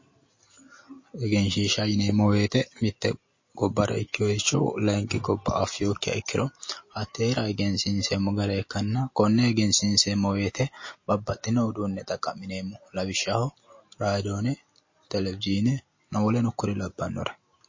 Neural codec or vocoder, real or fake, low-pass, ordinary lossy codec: none; real; 7.2 kHz; MP3, 32 kbps